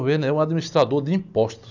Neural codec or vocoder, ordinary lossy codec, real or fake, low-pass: none; none; real; 7.2 kHz